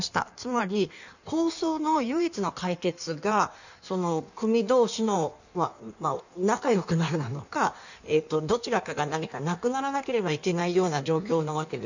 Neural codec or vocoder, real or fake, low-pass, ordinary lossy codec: codec, 16 kHz in and 24 kHz out, 1.1 kbps, FireRedTTS-2 codec; fake; 7.2 kHz; none